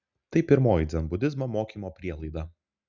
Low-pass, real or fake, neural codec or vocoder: 7.2 kHz; real; none